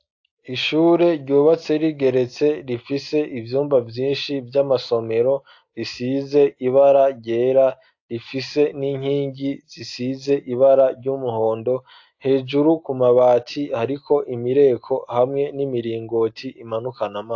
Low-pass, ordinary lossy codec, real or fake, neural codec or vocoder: 7.2 kHz; AAC, 48 kbps; real; none